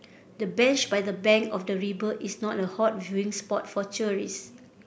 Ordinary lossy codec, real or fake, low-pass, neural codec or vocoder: none; real; none; none